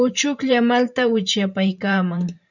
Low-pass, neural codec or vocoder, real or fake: 7.2 kHz; vocoder, 44.1 kHz, 128 mel bands every 512 samples, BigVGAN v2; fake